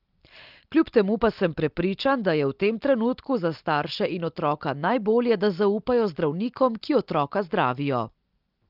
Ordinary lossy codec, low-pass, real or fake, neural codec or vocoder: Opus, 32 kbps; 5.4 kHz; real; none